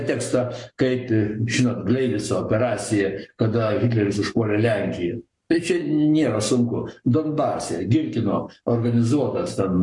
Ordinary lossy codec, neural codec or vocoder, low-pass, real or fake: MP3, 64 kbps; codec, 44.1 kHz, 7.8 kbps, Pupu-Codec; 10.8 kHz; fake